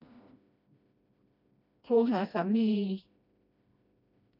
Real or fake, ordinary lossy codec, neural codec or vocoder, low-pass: fake; none; codec, 16 kHz, 1 kbps, FreqCodec, smaller model; 5.4 kHz